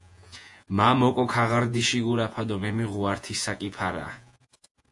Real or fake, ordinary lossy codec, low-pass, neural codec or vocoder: fake; AAC, 64 kbps; 10.8 kHz; vocoder, 48 kHz, 128 mel bands, Vocos